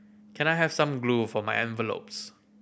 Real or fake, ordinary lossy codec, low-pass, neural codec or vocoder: real; none; none; none